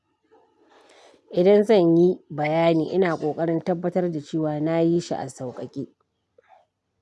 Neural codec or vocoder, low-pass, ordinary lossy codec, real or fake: none; none; none; real